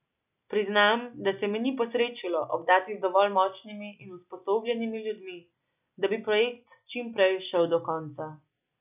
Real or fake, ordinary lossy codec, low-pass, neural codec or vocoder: real; none; 3.6 kHz; none